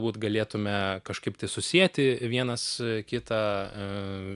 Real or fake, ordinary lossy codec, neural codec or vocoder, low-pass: real; AAC, 96 kbps; none; 10.8 kHz